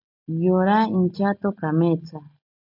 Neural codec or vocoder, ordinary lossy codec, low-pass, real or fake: none; MP3, 48 kbps; 5.4 kHz; real